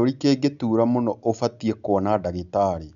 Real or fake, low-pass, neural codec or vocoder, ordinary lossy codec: real; 7.2 kHz; none; none